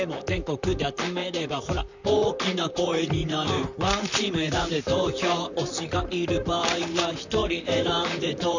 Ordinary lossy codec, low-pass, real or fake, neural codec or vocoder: none; 7.2 kHz; fake; vocoder, 44.1 kHz, 128 mel bands, Pupu-Vocoder